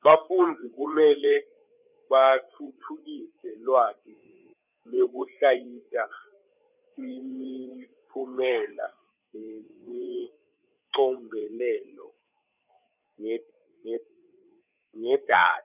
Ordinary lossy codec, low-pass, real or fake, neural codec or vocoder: none; 3.6 kHz; fake; codec, 16 kHz, 8 kbps, FreqCodec, larger model